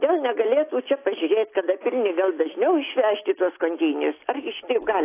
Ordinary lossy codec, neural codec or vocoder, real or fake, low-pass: AAC, 24 kbps; none; real; 3.6 kHz